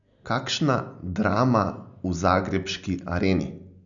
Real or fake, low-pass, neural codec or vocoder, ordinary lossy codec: real; 7.2 kHz; none; none